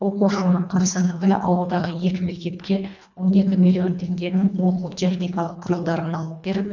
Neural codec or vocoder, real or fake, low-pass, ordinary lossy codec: codec, 24 kHz, 1.5 kbps, HILCodec; fake; 7.2 kHz; none